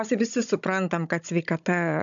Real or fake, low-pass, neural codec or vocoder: fake; 7.2 kHz; codec, 16 kHz, 16 kbps, FunCodec, trained on Chinese and English, 50 frames a second